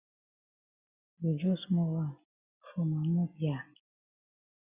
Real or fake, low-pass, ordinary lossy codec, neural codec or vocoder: real; 3.6 kHz; Opus, 64 kbps; none